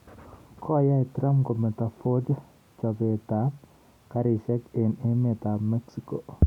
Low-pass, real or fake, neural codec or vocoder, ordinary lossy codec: 19.8 kHz; real; none; none